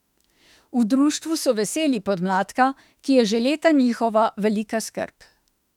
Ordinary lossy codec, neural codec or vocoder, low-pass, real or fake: none; autoencoder, 48 kHz, 32 numbers a frame, DAC-VAE, trained on Japanese speech; 19.8 kHz; fake